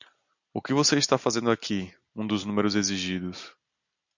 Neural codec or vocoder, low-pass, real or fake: none; 7.2 kHz; real